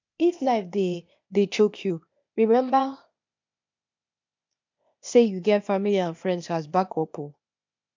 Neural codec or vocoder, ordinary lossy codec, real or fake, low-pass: codec, 16 kHz, 0.8 kbps, ZipCodec; MP3, 64 kbps; fake; 7.2 kHz